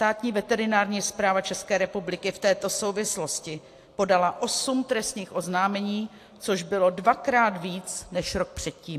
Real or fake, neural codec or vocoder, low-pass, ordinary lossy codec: real; none; 14.4 kHz; AAC, 64 kbps